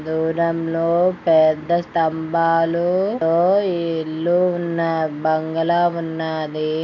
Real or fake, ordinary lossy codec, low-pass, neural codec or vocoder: real; none; 7.2 kHz; none